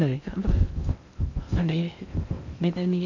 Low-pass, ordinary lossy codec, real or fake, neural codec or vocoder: 7.2 kHz; none; fake; codec, 16 kHz in and 24 kHz out, 0.6 kbps, FocalCodec, streaming, 2048 codes